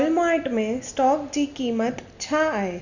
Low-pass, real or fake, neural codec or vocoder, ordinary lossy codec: 7.2 kHz; real; none; none